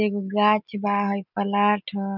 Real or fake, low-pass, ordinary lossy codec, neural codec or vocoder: real; 5.4 kHz; none; none